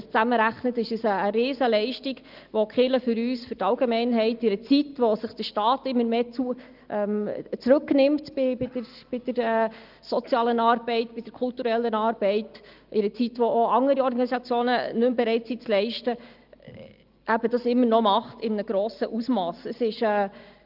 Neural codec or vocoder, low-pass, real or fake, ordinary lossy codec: none; 5.4 kHz; real; Opus, 32 kbps